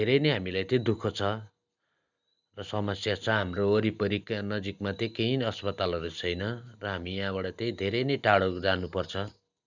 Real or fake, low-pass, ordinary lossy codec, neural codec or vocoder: real; 7.2 kHz; none; none